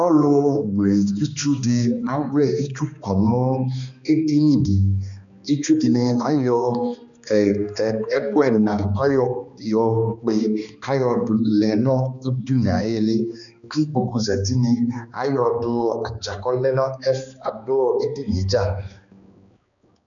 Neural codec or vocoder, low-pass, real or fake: codec, 16 kHz, 2 kbps, X-Codec, HuBERT features, trained on balanced general audio; 7.2 kHz; fake